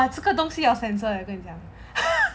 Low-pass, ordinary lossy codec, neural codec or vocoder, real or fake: none; none; none; real